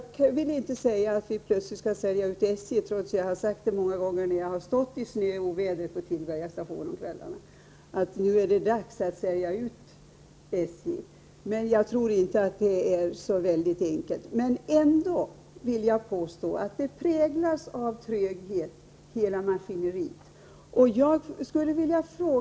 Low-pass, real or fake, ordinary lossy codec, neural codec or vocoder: none; real; none; none